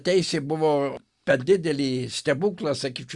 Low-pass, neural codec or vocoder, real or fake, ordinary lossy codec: 10.8 kHz; none; real; Opus, 64 kbps